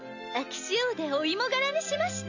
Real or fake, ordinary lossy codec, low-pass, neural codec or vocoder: real; none; 7.2 kHz; none